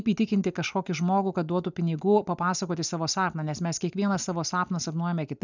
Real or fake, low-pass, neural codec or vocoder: real; 7.2 kHz; none